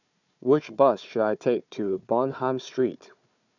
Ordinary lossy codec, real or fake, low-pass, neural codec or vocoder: none; fake; 7.2 kHz; codec, 16 kHz, 4 kbps, FunCodec, trained on Chinese and English, 50 frames a second